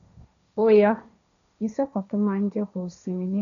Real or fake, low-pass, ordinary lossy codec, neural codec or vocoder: fake; 7.2 kHz; none; codec, 16 kHz, 1.1 kbps, Voila-Tokenizer